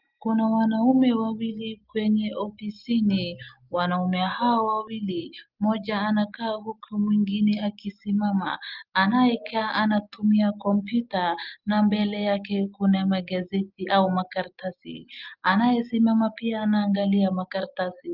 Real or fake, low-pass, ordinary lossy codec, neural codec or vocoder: real; 5.4 kHz; Opus, 24 kbps; none